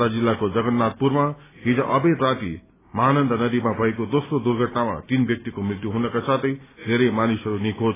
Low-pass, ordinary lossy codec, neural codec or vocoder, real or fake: 3.6 kHz; AAC, 16 kbps; none; real